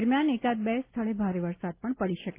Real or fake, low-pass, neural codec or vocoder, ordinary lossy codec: real; 3.6 kHz; none; Opus, 24 kbps